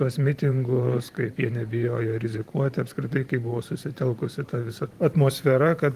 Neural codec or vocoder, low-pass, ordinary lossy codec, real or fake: vocoder, 44.1 kHz, 128 mel bands every 512 samples, BigVGAN v2; 14.4 kHz; Opus, 24 kbps; fake